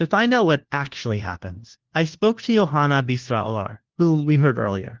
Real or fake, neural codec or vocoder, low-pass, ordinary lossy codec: fake; codec, 16 kHz, 1 kbps, FunCodec, trained on LibriTTS, 50 frames a second; 7.2 kHz; Opus, 16 kbps